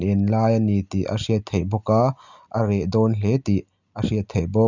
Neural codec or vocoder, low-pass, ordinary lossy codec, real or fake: none; 7.2 kHz; none; real